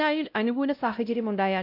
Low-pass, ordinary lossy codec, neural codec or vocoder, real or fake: 5.4 kHz; none; codec, 16 kHz, 0.5 kbps, X-Codec, WavLM features, trained on Multilingual LibriSpeech; fake